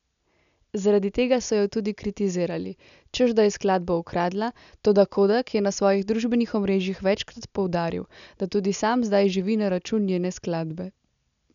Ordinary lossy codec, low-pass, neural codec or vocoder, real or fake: none; 7.2 kHz; none; real